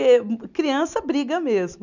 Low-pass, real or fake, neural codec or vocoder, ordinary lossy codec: 7.2 kHz; real; none; none